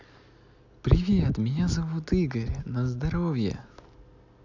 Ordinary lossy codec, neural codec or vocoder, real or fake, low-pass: none; none; real; 7.2 kHz